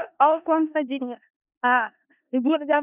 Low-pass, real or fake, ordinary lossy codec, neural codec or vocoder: 3.6 kHz; fake; none; codec, 16 kHz in and 24 kHz out, 0.4 kbps, LongCat-Audio-Codec, four codebook decoder